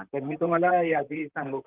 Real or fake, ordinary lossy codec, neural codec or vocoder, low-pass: fake; Opus, 32 kbps; vocoder, 44.1 kHz, 128 mel bands, Pupu-Vocoder; 3.6 kHz